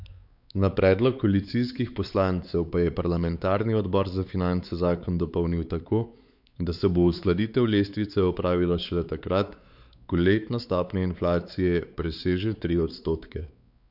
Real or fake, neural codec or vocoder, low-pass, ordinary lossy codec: fake; codec, 16 kHz, 4 kbps, X-Codec, WavLM features, trained on Multilingual LibriSpeech; 5.4 kHz; none